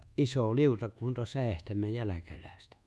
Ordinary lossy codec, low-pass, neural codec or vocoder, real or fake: none; none; codec, 24 kHz, 1.2 kbps, DualCodec; fake